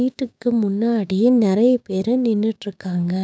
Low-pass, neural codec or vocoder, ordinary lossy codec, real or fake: none; codec, 16 kHz, 6 kbps, DAC; none; fake